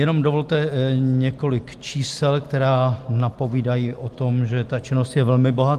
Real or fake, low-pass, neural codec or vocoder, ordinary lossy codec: real; 14.4 kHz; none; Opus, 24 kbps